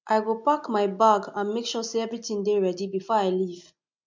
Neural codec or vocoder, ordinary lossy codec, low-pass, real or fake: none; MP3, 64 kbps; 7.2 kHz; real